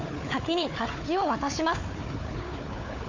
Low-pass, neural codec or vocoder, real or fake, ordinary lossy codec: 7.2 kHz; codec, 16 kHz, 4 kbps, FunCodec, trained on Chinese and English, 50 frames a second; fake; MP3, 48 kbps